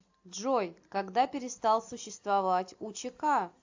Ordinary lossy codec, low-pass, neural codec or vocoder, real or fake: AAC, 48 kbps; 7.2 kHz; none; real